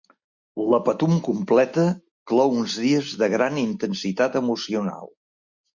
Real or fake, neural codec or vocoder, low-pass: real; none; 7.2 kHz